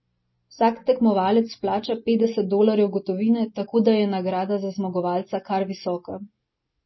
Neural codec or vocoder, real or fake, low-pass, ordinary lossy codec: none; real; 7.2 kHz; MP3, 24 kbps